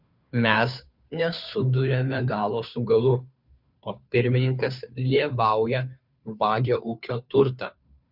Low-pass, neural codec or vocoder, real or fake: 5.4 kHz; codec, 16 kHz, 2 kbps, FunCodec, trained on Chinese and English, 25 frames a second; fake